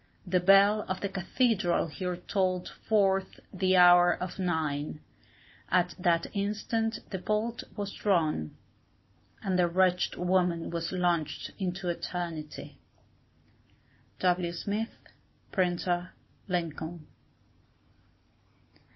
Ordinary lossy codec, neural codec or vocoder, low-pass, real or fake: MP3, 24 kbps; none; 7.2 kHz; real